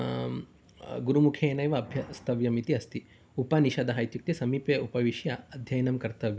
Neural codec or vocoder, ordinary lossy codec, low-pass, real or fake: none; none; none; real